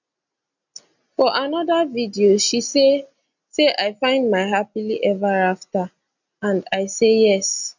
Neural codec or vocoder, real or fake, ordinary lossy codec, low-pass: none; real; none; 7.2 kHz